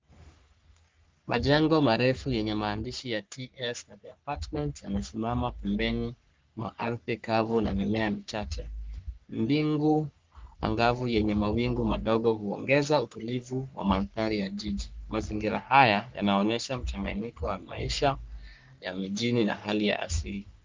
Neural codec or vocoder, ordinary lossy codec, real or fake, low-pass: codec, 44.1 kHz, 3.4 kbps, Pupu-Codec; Opus, 32 kbps; fake; 7.2 kHz